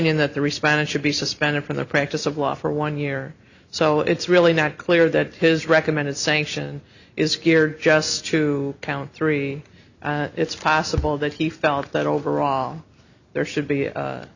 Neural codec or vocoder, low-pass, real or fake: none; 7.2 kHz; real